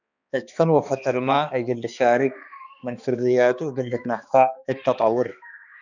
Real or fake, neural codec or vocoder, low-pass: fake; codec, 16 kHz, 2 kbps, X-Codec, HuBERT features, trained on balanced general audio; 7.2 kHz